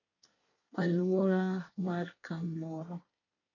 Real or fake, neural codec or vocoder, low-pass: fake; codec, 24 kHz, 1 kbps, SNAC; 7.2 kHz